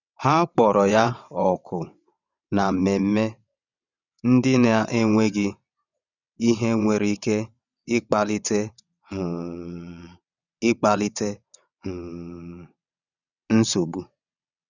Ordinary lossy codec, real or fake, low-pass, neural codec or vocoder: none; fake; 7.2 kHz; vocoder, 22.05 kHz, 80 mel bands, WaveNeXt